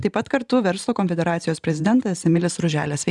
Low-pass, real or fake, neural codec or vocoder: 10.8 kHz; fake; vocoder, 44.1 kHz, 128 mel bands every 512 samples, BigVGAN v2